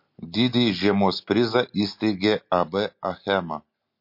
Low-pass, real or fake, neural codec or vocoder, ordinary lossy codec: 5.4 kHz; real; none; MP3, 32 kbps